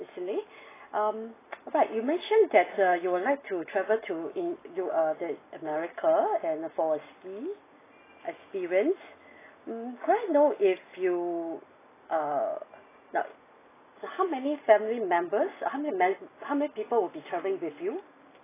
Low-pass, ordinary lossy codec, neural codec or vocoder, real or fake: 3.6 kHz; AAC, 16 kbps; none; real